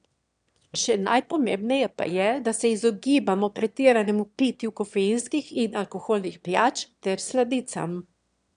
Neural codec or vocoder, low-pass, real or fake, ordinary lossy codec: autoencoder, 22.05 kHz, a latent of 192 numbers a frame, VITS, trained on one speaker; 9.9 kHz; fake; none